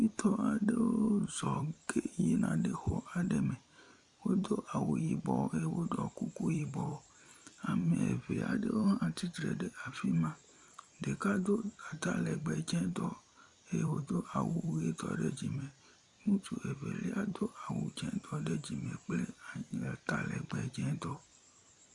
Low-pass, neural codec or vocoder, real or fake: 10.8 kHz; vocoder, 44.1 kHz, 128 mel bands every 256 samples, BigVGAN v2; fake